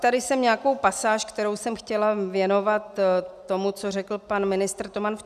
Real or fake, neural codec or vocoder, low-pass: real; none; 14.4 kHz